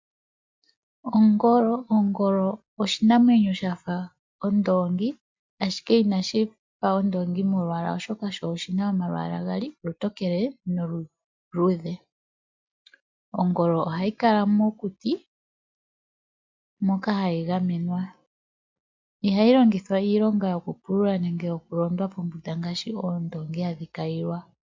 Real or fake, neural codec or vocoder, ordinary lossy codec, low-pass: real; none; MP3, 64 kbps; 7.2 kHz